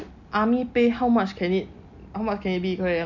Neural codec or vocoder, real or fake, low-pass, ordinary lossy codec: none; real; 7.2 kHz; none